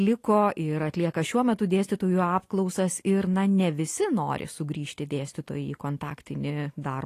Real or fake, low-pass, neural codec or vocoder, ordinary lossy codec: real; 14.4 kHz; none; AAC, 48 kbps